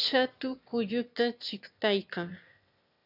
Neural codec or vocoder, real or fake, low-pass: autoencoder, 22.05 kHz, a latent of 192 numbers a frame, VITS, trained on one speaker; fake; 5.4 kHz